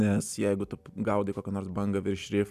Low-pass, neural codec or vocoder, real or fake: 14.4 kHz; none; real